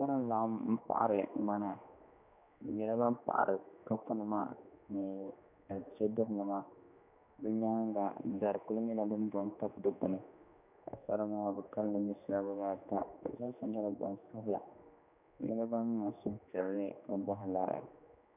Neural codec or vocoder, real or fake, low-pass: codec, 16 kHz, 2 kbps, X-Codec, HuBERT features, trained on general audio; fake; 3.6 kHz